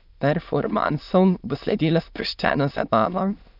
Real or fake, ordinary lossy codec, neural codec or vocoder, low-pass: fake; none; autoencoder, 22.05 kHz, a latent of 192 numbers a frame, VITS, trained on many speakers; 5.4 kHz